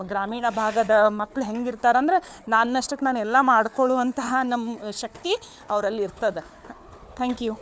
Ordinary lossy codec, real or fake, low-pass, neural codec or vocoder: none; fake; none; codec, 16 kHz, 4 kbps, FunCodec, trained on Chinese and English, 50 frames a second